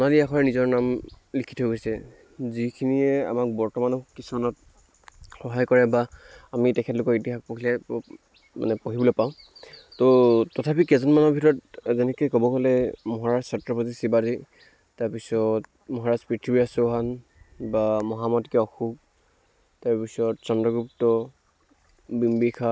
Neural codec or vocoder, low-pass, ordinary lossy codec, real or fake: none; none; none; real